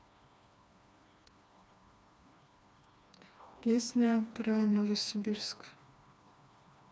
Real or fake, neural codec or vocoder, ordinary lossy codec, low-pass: fake; codec, 16 kHz, 2 kbps, FreqCodec, smaller model; none; none